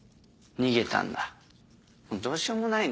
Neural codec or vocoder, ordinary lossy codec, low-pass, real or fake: none; none; none; real